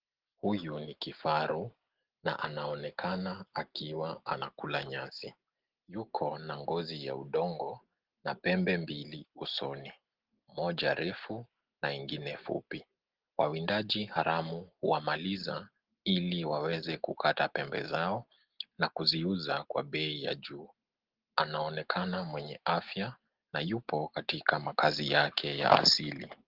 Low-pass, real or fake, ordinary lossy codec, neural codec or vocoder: 5.4 kHz; real; Opus, 16 kbps; none